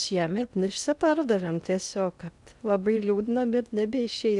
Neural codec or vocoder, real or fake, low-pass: codec, 16 kHz in and 24 kHz out, 0.6 kbps, FocalCodec, streaming, 4096 codes; fake; 10.8 kHz